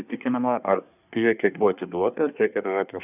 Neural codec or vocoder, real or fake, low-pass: codec, 24 kHz, 1 kbps, SNAC; fake; 3.6 kHz